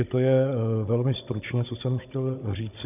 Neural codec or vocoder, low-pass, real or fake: codec, 16 kHz, 16 kbps, FunCodec, trained on Chinese and English, 50 frames a second; 3.6 kHz; fake